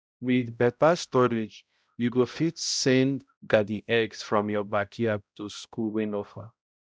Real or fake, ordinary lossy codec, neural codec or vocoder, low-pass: fake; none; codec, 16 kHz, 0.5 kbps, X-Codec, HuBERT features, trained on balanced general audio; none